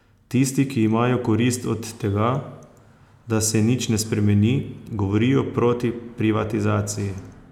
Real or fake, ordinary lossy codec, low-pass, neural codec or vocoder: real; none; 19.8 kHz; none